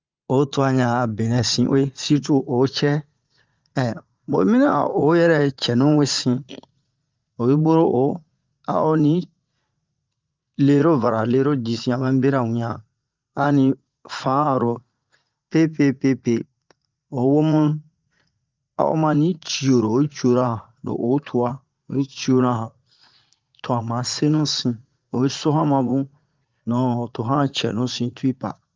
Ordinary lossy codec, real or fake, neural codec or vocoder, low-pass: Opus, 24 kbps; fake; vocoder, 44.1 kHz, 80 mel bands, Vocos; 7.2 kHz